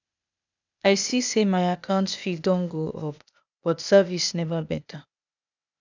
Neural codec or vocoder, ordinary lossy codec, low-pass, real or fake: codec, 16 kHz, 0.8 kbps, ZipCodec; none; 7.2 kHz; fake